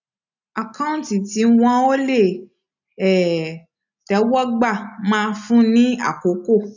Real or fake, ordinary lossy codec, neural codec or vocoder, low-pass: real; none; none; 7.2 kHz